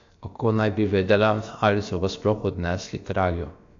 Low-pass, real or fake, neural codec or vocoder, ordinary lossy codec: 7.2 kHz; fake; codec, 16 kHz, about 1 kbps, DyCAST, with the encoder's durations; AAC, 48 kbps